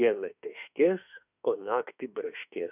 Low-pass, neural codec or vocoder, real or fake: 3.6 kHz; autoencoder, 48 kHz, 32 numbers a frame, DAC-VAE, trained on Japanese speech; fake